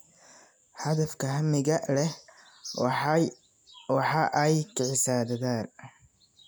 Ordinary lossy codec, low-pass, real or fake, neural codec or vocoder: none; none; real; none